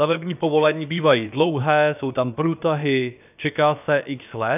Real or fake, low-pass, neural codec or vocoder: fake; 3.6 kHz; codec, 16 kHz, about 1 kbps, DyCAST, with the encoder's durations